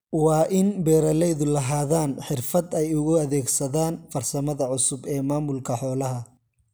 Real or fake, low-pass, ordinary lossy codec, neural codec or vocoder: real; none; none; none